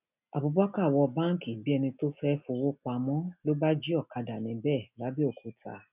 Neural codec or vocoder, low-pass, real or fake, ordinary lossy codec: none; 3.6 kHz; real; none